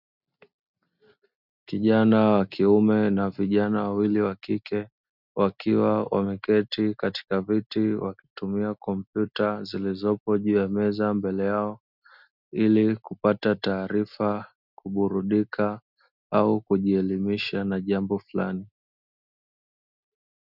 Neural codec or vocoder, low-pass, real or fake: none; 5.4 kHz; real